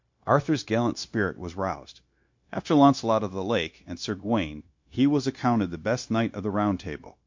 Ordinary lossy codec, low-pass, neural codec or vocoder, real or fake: MP3, 48 kbps; 7.2 kHz; codec, 16 kHz, 0.9 kbps, LongCat-Audio-Codec; fake